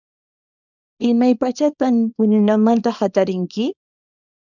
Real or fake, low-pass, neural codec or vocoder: fake; 7.2 kHz; codec, 24 kHz, 0.9 kbps, WavTokenizer, small release